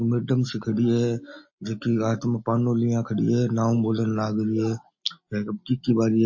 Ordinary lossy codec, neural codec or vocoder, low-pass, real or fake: MP3, 32 kbps; none; 7.2 kHz; real